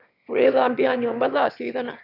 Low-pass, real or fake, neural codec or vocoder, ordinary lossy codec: 5.4 kHz; fake; autoencoder, 22.05 kHz, a latent of 192 numbers a frame, VITS, trained on one speaker; none